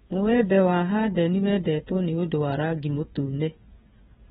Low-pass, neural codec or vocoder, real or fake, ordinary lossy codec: 7.2 kHz; codec, 16 kHz, 16 kbps, FreqCodec, smaller model; fake; AAC, 16 kbps